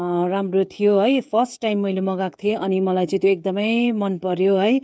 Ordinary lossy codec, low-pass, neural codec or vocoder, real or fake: none; none; codec, 16 kHz, 16 kbps, FreqCodec, smaller model; fake